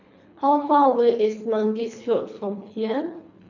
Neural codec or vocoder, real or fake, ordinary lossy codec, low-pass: codec, 24 kHz, 3 kbps, HILCodec; fake; none; 7.2 kHz